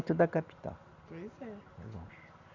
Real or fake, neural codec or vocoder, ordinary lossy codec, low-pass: real; none; Opus, 64 kbps; 7.2 kHz